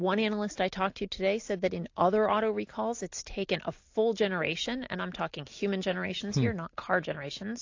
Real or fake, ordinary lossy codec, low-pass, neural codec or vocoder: real; AAC, 48 kbps; 7.2 kHz; none